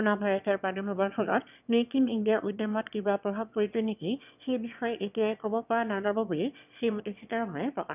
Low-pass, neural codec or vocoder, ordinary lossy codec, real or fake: 3.6 kHz; autoencoder, 22.05 kHz, a latent of 192 numbers a frame, VITS, trained on one speaker; none; fake